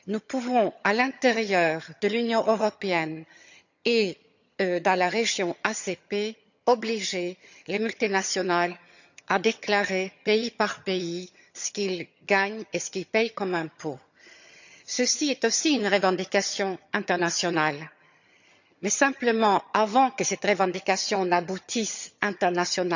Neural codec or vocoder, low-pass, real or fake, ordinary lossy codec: vocoder, 22.05 kHz, 80 mel bands, HiFi-GAN; 7.2 kHz; fake; none